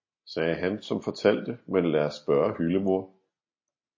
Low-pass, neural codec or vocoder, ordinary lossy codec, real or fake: 7.2 kHz; none; MP3, 32 kbps; real